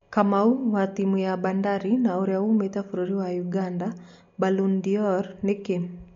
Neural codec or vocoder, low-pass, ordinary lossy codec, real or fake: none; 7.2 kHz; MP3, 48 kbps; real